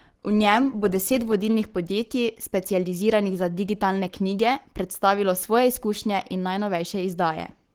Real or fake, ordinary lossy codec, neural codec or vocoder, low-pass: fake; Opus, 16 kbps; codec, 44.1 kHz, 7.8 kbps, Pupu-Codec; 19.8 kHz